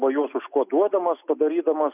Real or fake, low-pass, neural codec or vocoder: real; 3.6 kHz; none